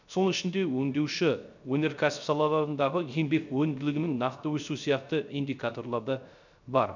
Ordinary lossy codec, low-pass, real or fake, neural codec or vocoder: none; 7.2 kHz; fake; codec, 16 kHz, 0.3 kbps, FocalCodec